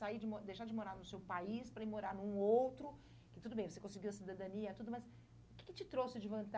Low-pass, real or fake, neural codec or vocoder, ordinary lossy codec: none; real; none; none